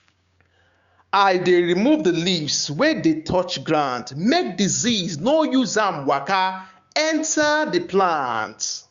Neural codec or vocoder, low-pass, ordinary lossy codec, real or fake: codec, 16 kHz, 6 kbps, DAC; 7.2 kHz; Opus, 64 kbps; fake